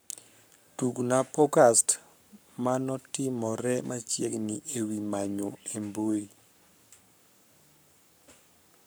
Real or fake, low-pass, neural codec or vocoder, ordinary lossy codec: fake; none; codec, 44.1 kHz, 7.8 kbps, Pupu-Codec; none